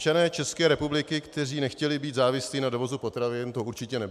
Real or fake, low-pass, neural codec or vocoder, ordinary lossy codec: real; 14.4 kHz; none; MP3, 96 kbps